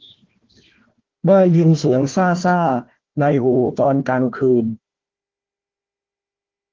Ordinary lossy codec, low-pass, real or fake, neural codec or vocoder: Opus, 16 kbps; 7.2 kHz; fake; codec, 16 kHz, 1 kbps, FunCodec, trained on Chinese and English, 50 frames a second